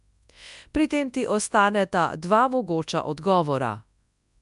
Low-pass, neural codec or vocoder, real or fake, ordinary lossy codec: 10.8 kHz; codec, 24 kHz, 0.9 kbps, WavTokenizer, large speech release; fake; none